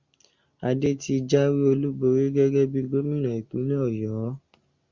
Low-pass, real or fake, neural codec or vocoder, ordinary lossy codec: 7.2 kHz; real; none; Opus, 64 kbps